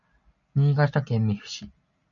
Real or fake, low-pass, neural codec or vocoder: real; 7.2 kHz; none